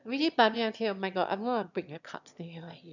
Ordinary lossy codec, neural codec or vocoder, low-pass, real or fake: none; autoencoder, 22.05 kHz, a latent of 192 numbers a frame, VITS, trained on one speaker; 7.2 kHz; fake